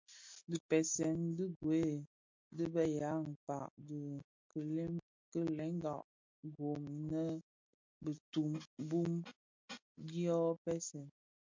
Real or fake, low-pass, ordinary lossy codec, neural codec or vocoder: real; 7.2 kHz; MP3, 48 kbps; none